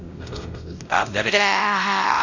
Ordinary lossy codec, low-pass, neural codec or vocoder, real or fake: none; 7.2 kHz; codec, 16 kHz, 0.5 kbps, X-Codec, WavLM features, trained on Multilingual LibriSpeech; fake